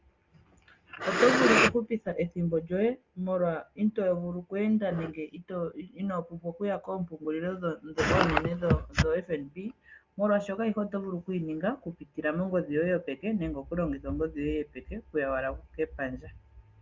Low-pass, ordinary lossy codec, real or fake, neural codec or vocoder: 7.2 kHz; Opus, 32 kbps; real; none